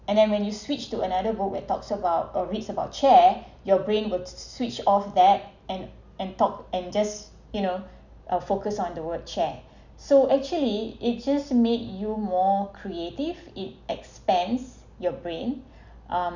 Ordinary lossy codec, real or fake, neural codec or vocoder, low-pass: none; real; none; 7.2 kHz